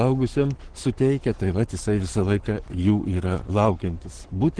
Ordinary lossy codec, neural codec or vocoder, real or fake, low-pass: Opus, 16 kbps; codec, 44.1 kHz, 7.8 kbps, Pupu-Codec; fake; 9.9 kHz